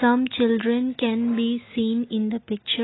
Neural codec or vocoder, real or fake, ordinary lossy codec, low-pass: none; real; AAC, 16 kbps; 7.2 kHz